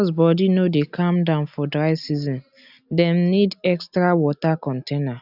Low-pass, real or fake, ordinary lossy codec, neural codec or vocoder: 5.4 kHz; real; none; none